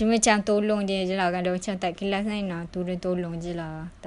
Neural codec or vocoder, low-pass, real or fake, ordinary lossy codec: none; 10.8 kHz; real; none